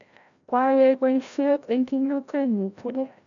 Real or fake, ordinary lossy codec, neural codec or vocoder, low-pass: fake; none; codec, 16 kHz, 0.5 kbps, FreqCodec, larger model; 7.2 kHz